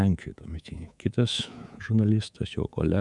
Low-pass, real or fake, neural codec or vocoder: 10.8 kHz; fake; codec, 24 kHz, 3.1 kbps, DualCodec